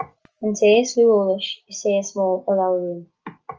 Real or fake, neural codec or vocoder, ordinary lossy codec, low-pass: real; none; Opus, 24 kbps; 7.2 kHz